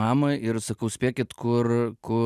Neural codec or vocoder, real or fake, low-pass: none; real; 14.4 kHz